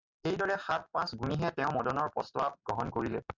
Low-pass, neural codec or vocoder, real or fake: 7.2 kHz; none; real